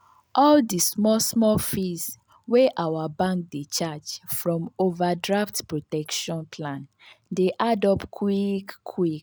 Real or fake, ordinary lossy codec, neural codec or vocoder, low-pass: real; none; none; none